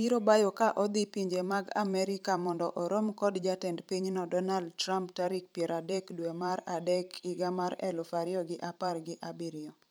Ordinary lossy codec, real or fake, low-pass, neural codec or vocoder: none; fake; none; vocoder, 44.1 kHz, 128 mel bands every 512 samples, BigVGAN v2